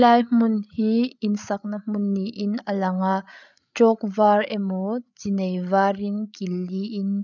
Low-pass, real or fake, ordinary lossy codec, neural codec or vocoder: 7.2 kHz; fake; none; codec, 16 kHz, 8 kbps, FreqCodec, larger model